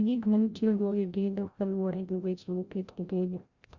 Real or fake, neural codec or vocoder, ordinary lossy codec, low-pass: fake; codec, 16 kHz, 0.5 kbps, FreqCodec, larger model; Opus, 64 kbps; 7.2 kHz